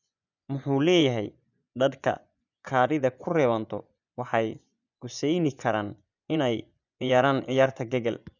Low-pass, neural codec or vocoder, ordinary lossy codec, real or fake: 7.2 kHz; vocoder, 24 kHz, 100 mel bands, Vocos; none; fake